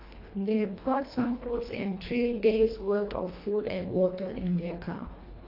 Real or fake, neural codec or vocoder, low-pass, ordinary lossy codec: fake; codec, 24 kHz, 1.5 kbps, HILCodec; 5.4 kHz; AAC, 32 kbps